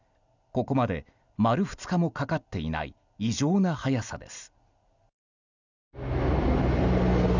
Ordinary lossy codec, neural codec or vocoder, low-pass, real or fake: none; none; 7.2 kHz; real